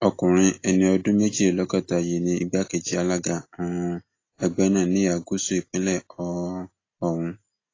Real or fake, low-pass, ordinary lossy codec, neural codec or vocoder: real; 7.2 kHz; AAC, 32 kbps; none